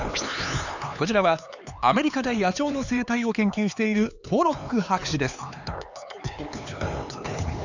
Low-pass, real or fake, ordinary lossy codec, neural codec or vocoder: 7.2 kHz; fake; none; codec, 16 kHz, 4 kbps, X-Codec, HuBERT features, trained on LibriSpeech